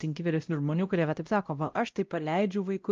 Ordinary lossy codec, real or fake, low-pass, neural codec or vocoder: Opus, 24 kbps; fake; 7.2 kHz; codec, 16 kHz, 0.5 kbps, X-Codec, WavLM features, trained on Multilingual LibriSpeech